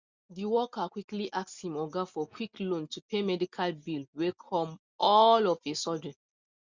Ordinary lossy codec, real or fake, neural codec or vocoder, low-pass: Opus, 64 kbps; real; none; 7.2 kHz